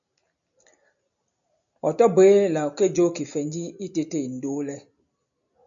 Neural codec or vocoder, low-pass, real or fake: none; 7.2 kHz; real